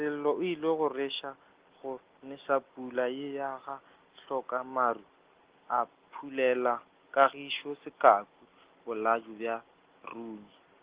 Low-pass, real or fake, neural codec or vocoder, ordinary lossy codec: 3.6 kHz; real; none; Opus, 16 kbps